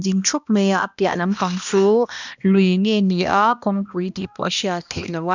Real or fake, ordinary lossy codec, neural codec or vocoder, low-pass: fake; none; codec, 16 kHz, 1 kbps, X-Codec, HuBERT features, trained on balanced general audio; 7.2 kHz